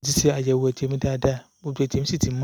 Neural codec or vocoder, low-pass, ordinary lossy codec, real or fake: none; none; none; real